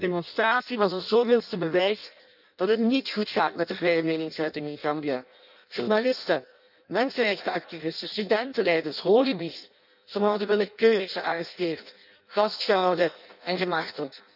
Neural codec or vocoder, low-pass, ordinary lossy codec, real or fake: codec, 16 kHz in and 24 kHz out, 0.6 kbps, FireRedTTS-2 codec; 5.4 kHz; none; fake